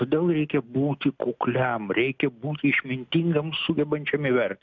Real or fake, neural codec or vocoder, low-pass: real; none; 7.2 kHz